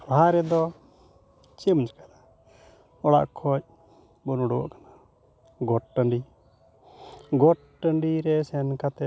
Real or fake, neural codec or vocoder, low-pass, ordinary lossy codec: real; none; none; none